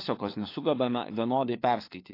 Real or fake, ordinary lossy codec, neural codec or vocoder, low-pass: fake; AAC, 32 kbps; codec, 16 kHz, 4 kbps, FunCodec, trained on LibriTTS, 50 frames a second; 5.4 kHz